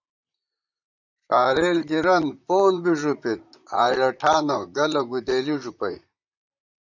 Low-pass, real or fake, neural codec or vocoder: 7.2 kHz; fake; vocoder, 44.1 kHz, 128 mel bands, Pupu-Vocoder